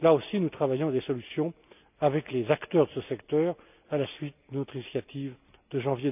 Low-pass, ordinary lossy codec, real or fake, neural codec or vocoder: 3.6 kHz; none; real; none